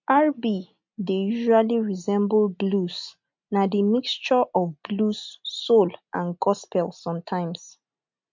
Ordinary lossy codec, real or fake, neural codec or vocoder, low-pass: MP3, 48 kbps; real; none; 7.2 kHz